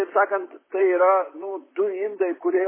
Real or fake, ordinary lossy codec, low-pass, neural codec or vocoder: fake; MP3, 16 kbps; 3.6 kHz; vocoder, 44.1 kHz, 128 mel bands, Pupu-Vocoder